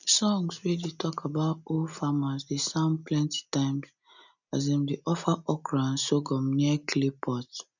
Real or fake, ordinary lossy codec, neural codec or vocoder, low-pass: real; none; none; 7.2 kHz